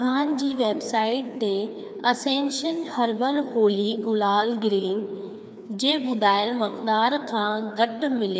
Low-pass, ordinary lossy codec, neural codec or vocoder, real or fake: none; none; codec, 16 kHz, 2 kbps, FreqCodec, larger model; fake